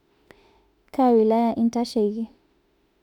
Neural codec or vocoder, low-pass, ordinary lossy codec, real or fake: autoencoder, 48 kHz, 32 numbers a frame, DAC-VAE, trained on Japanese speech; 19.8 kHz; none; fake